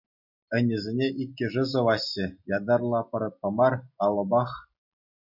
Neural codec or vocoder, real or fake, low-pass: none; real; 5.4 kHz